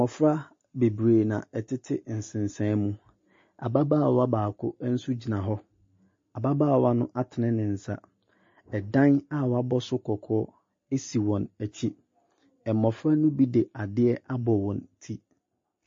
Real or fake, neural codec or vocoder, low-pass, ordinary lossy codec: real; none; 7.2 kHz; MP3, 32 kbps